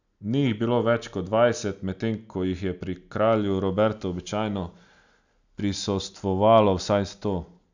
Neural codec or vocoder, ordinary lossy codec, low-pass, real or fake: none; none; 7.2 kHz; real